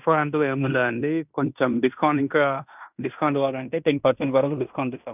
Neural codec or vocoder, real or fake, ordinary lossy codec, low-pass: codec, 16 kHz in and 24 kHz out, 0.9 kbps, LongCat-Audio-Codec, fine tuned four codebook decoder; fake; none; 3.6 kHz